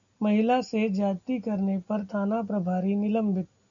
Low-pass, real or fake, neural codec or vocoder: 7.2 kHz; real; none